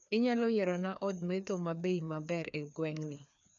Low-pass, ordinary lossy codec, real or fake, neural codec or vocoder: 7.2 kHz; none; fake; codec, 16 kHz, 2 kbps, FreqCodec, larger model